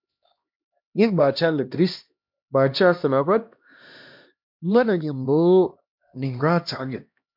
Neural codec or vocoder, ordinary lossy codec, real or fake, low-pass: codec, 16 kHz, 1 kbps, X-Codec, HuBERT features, trained on LibriSpeech; MP3, 48 kbps; fake; 5.4 kHz